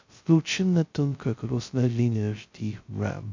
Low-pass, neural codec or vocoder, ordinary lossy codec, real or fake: 7.2 kHz; codec, 16 kHz, 0.2 kbps, FocalCodec; MP3, 64 kbps; fake